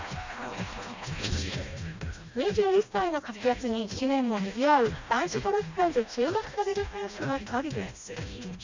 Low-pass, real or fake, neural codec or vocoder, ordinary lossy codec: 7.2 kHz; fake; codec, 16 kHz, 1 kbps, FreqCodec, smaller model; MP3, 64 kbps